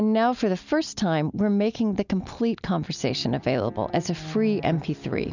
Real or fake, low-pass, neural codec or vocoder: real; 7.2 kHz; none